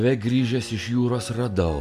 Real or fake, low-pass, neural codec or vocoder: real; 14.4 kHz; none